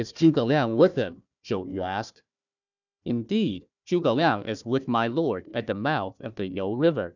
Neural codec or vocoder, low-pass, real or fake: codec, 16 kHz, 1 kbps, FunCodec, trained on Chinese and English, 50 frames a second; 7.2 kHz; fake